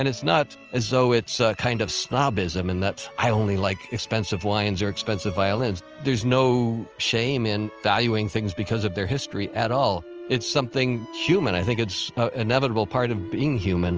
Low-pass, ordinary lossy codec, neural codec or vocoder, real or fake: 7.2 kHz; Opus, 24 kbps; none; real